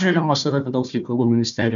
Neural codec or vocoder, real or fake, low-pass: codec, 16 kHz, 1 kbps, FunCodec, trained on Chinese and English, 50 frames a second; fake; 7.2 kHz